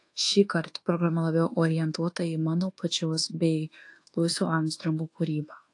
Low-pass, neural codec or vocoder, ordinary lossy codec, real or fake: 10.8 kHz; codec, 24 kHz, 1.2 kbps, DualCodec; AAC, 48 kbps; fake